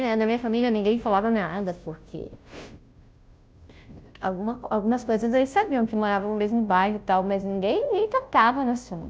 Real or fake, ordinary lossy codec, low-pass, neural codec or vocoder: fake; none; none; codec, 16 kHz, 0.5 kbps, FunCodec, trained on Chinese and English, 25 frames a second